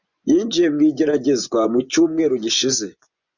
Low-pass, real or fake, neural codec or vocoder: 7.2 kHz; fake; vocoder, 24 kHz, 100 mel bands, Vocos